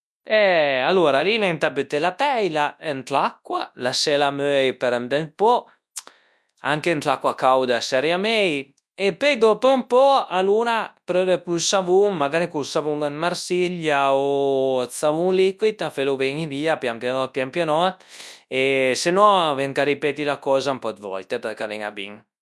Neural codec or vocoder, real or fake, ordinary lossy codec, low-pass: codec, 24 kHz, 0.9 kbps, WavTokenizer, large speech release; fake; none; none